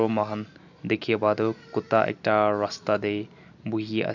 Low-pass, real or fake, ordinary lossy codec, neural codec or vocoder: 7.2 kHz; real; none; none